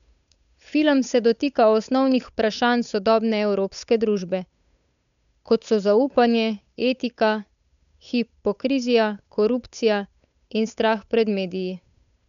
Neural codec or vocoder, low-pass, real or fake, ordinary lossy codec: codec, 16 kHz, 8 kbps, FunCodec, trained on Chinese and English, 25 frames a second; 7.2 kHz; fake; MP3, 96 kbps